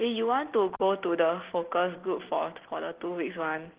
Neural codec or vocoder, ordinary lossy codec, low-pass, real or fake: none; Opus, 16 kbps; 3.6 kHz; real